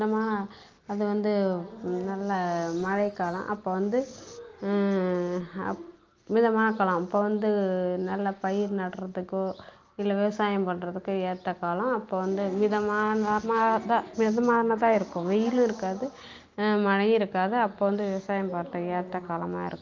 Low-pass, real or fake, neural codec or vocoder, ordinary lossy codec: 7.2 kHz; real; none; Opus, 24 kbps